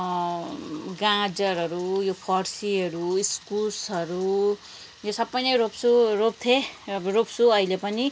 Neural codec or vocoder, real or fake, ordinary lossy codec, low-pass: none; real; none; none